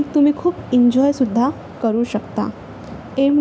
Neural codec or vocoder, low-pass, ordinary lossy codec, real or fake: none; none; none; real